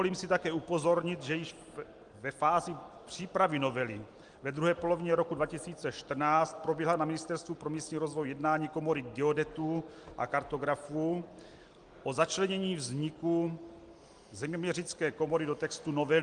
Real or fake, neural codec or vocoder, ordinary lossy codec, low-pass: real; none; Opus, 24 kbps; 9.9 kHz